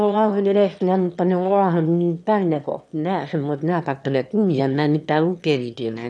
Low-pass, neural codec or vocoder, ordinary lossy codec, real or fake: none; autoencoder, 22.05 kHz, a latent of 192 numbers a frame, VITS, trained on one speaker; none; fake